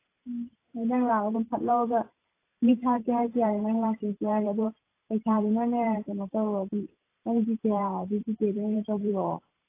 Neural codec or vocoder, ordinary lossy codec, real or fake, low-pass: vocoder, 44.1 kHz, 128 mel bands every 512 samples, BigVGAN v2; AAC, 24 kbps; fake; 3.6 kHz